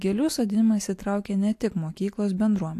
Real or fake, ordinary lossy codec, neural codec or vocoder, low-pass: real; AAC, 64 kbps; none; 14.4 kHz